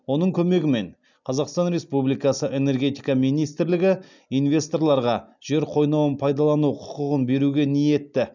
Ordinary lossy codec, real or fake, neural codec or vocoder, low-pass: none; real; none; 7.2 kHz